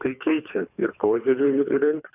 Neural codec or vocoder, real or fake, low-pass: codec, 24 kHz, 3 kbps, HILCodec; fake; 3.6 kHz